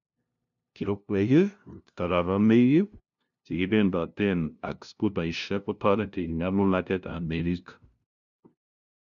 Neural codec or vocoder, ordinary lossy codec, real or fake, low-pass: codec, 16 kHz, 0.5 kbps, FunCodec, trained on LibriTTS, 25 frames a second; none; fake; 7.2 kHz